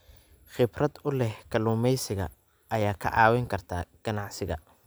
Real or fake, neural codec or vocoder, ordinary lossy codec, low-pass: real; none; none; none